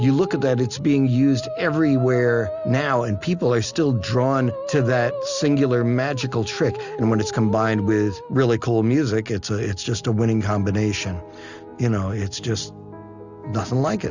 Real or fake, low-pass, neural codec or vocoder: real; 7.2 kHz; none